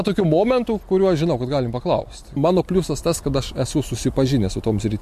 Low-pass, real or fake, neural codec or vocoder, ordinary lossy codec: 14.4 kHz; real; none; MP3, 64 kbps